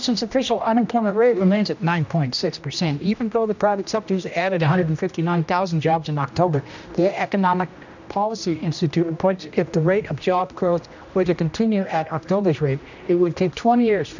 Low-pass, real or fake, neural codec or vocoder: 7.2 kHz; fake; codec, 16 kHz, 1 kbps, X-Codec, HuBERT features, trained on general audio